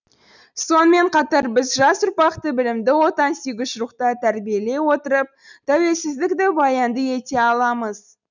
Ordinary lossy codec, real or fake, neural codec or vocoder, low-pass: none; real; none; 7.2 kHz